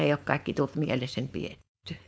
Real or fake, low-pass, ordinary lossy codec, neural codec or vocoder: fake; none; none; codec, 16 kHz, 4.8 kbps, FACodec